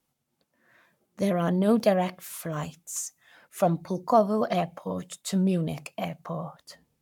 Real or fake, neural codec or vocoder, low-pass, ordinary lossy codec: fake; codec, 44.1 kHz, 7.8 kbps, Pupu-Codec; 19.8 kHz; none